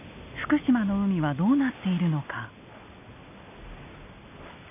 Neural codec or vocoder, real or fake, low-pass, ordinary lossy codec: none; real; 3.6 kHz; none